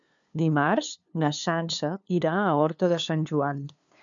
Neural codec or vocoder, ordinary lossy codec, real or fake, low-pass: codec, 16 kHz, 2 kbps, FunCodec, trained on LibriTTS, 25 frames a second; MP3, 96 kbps; fake; 7.2 kHz